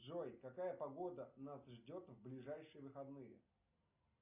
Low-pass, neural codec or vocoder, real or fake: 3.6 kHz; none; real